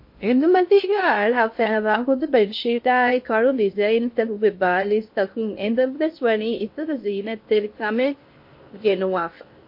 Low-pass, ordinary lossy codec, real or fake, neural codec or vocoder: 5.4 kHz; MP3, 32 kbps; fake; codec, 16 kHz in and 24 kHz out, 0.6 kbps, FocalCodec, streaming, 2048 codes